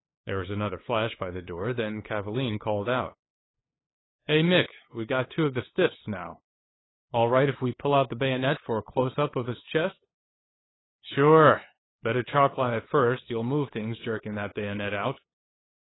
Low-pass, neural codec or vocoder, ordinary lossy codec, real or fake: 7.2 kHz; codec, 16 kHz, 8 kbps, FunCodec, trained on LibriTTS, 25 frames a second; AAC, 16 kbps; fake